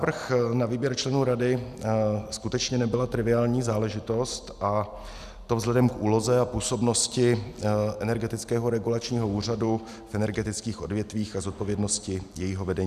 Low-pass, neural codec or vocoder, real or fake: 14.4 kHz; none; real